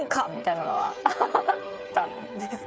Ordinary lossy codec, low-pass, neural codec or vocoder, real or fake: none; none; codec, 16 kHz, 8 kbps, FreqCodec, smaller model; fake